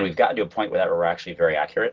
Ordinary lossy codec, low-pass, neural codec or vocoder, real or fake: Opus, 16 kbps; 7.2 kHz; none; real